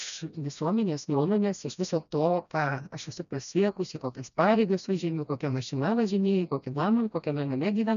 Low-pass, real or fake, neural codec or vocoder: 7.2 kHz; fake; codec, 16 kHz, 1 kbps, FreqCodec, smaller model